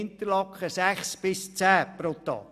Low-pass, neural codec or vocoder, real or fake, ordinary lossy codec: 14.4 kHz; none; real; none